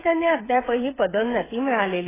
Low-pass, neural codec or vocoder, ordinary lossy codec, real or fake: 3.6 kHz; codec, 16 kHz, 8 kbps, FreqCodec, smaller model; AAC, 16 kbps; fake